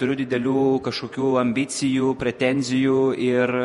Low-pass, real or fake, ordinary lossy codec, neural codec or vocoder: 19.8 kHz; fake; MP3, 48 kbps; vocoder, 48 kHz, 128 mel bands, Vocos